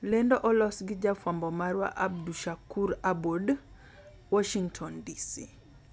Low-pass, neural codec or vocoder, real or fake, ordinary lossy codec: none; none; real; none